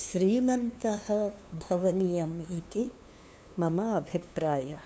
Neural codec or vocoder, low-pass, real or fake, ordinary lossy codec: codec, 16 kHz, 2 kbps, FunCodec, trained on LibriTTS, 25 frames a second; none; fake; none